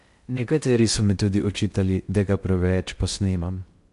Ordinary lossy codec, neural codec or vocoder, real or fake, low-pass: MP3, 64 kbps; codec, 16 kHz in and 24 kHz out, 0.6 kbps, FocalCodec, streaming, 2048 codes; fake; 10.8 kHz